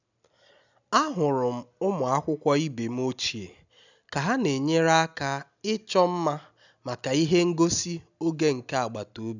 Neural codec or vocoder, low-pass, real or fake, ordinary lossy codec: none; 7.2 kHz; real; none